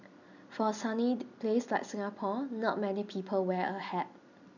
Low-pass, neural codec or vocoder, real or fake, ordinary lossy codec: 7.2 kHz; none; real; none